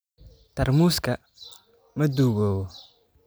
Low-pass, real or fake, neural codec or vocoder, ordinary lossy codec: none; real; none; none